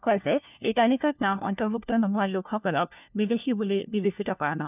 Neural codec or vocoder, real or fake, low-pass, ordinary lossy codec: codec, 16 kHz, 1 kbps, FunCodec, trained on LibriTTS, 50 frames a second; fake; 3.6 kHz; none